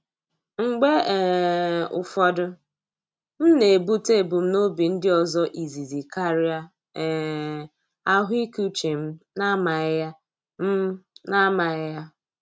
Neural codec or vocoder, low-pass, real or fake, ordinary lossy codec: none; none; real; none